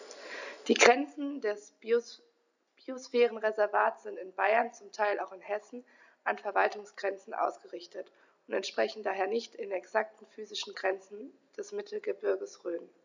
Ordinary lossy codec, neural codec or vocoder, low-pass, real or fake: none; none; 7.2 kHz; real